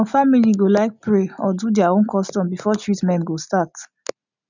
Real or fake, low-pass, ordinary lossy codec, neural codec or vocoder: real; 7.2 kHz; none; none